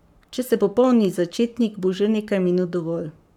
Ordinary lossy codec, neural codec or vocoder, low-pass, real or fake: none; codec, 44.1 kHz, 7.8 kbps, Pupu-Codec; 19.8 kHz; fake